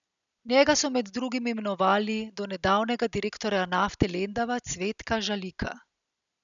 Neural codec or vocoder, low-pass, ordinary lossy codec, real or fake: none; 7.2 kHz; none; real